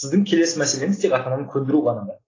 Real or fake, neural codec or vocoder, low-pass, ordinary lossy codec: real; none; 7.2 kHz; AAC, 32 kbps